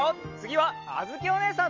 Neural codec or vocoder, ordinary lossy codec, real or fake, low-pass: none; Opus, 32 kbps; real; 7.2 kHz